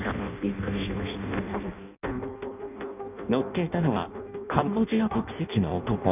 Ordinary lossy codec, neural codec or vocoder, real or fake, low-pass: none; codec, 16 kHz in and 24 kHz out, 0.6 kbps, FireRedTTS-2 codec; fake; 3.6 kHz